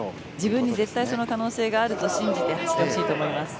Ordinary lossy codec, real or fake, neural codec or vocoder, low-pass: none; real; none; none